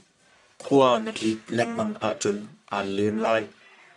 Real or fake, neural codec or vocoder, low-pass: fake; codec, 44.1 kHz, 1.7 kbps, Pupu-Codec; 10.8 kHz